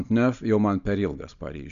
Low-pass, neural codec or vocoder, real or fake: 7.2 kHz; none; real